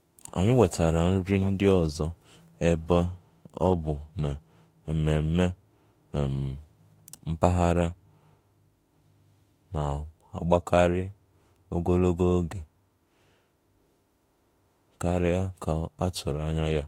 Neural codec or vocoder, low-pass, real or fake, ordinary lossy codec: autoencoder, 48 kHz, 32 numbers a frame, DAC-VAE, trained on Japanese speech; 19.8 kHz; fake; AAC, 48 kbps